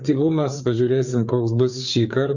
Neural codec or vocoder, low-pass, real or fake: codec, 16 kHz, 4 kbps, FreqCodec, larger model; 7.2 kHz; fake